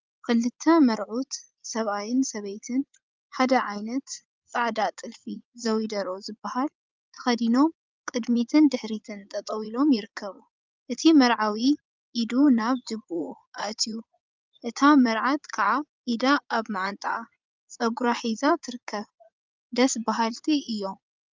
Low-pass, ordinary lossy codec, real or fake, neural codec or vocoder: 7.2 kHz; Opus, 24 kbps; fake; vocoder, 44.1 kHz, 80 mel bands, Vocos